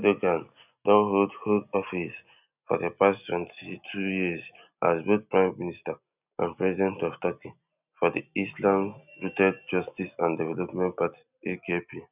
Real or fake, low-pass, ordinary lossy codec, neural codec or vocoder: real; 3.6 kHz; none; none